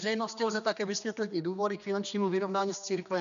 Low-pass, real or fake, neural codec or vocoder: 7.2 kHz; fake; codec, 16 kHz, 2 kbps, X-Codec, HuBERT features, trained on general audio